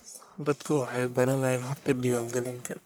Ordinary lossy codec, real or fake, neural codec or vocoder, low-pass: none; fake; codec, 44.1 kHz, 1.7 kbps, Pupu-Codec; none